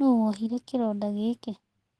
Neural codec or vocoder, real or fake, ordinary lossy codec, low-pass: none; real; Opus, 16 kbps; 10.8 kHz